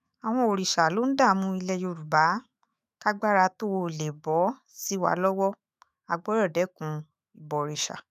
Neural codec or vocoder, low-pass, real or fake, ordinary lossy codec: autoencoder, 48 kHz, 128 numbers a frame, DAC-VAE, trained on Japanese speech; 14.4 kHz; fake; none